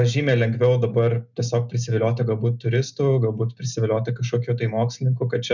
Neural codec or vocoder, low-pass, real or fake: none; 7.2 kHz; real